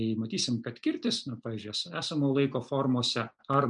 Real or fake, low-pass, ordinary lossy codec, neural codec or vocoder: real; 9.9 kHz; MP3, 96 kbps; none